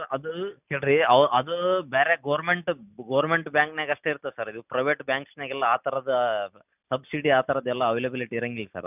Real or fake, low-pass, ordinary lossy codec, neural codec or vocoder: real; 3.6 kHz; none; none